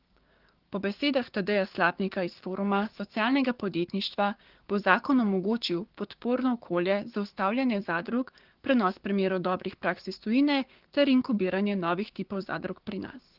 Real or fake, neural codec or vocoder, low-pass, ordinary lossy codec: fake; codec, 16 kHz, 6 kbps, DAC; 5.4 kHz; Opus, 16 kbps